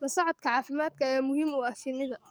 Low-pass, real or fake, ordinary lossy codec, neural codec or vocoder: none; fake; none; codec, 44.1 kHz, 7.8 kbps, DAC